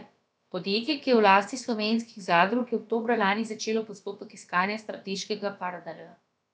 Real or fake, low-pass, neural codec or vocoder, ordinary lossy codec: fake; none; codec, 16 kHz, about 1 kbps, DyCAST, with the encoder's durations; none